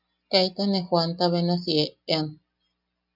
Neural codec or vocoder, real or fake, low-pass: none; real; 5.4 kHz